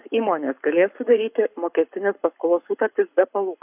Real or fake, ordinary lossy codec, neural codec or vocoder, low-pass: fake; AAC, 32 kbps; codec, 44.1 kHz, 7.8 kbps, Pupu-Codec; 3.6 kHz